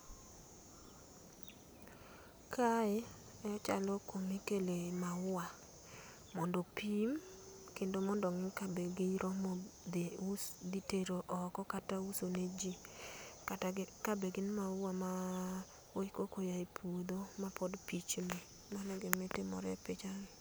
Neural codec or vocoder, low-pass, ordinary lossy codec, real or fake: none; none; none; real